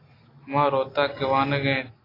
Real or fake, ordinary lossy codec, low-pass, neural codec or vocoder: real; AAC, 24 kbps; 5.4 kHz; none